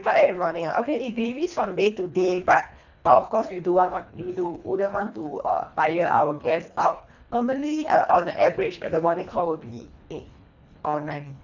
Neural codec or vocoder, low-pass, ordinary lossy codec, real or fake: codec, 24 kHz, 1.5 kbps, HILCodec; 7.2 kHz; none; fake